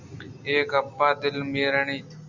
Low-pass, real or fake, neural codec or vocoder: 7.2 kHz; real; none